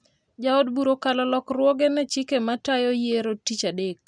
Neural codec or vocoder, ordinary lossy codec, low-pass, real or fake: none; none; 9.9 kHz; real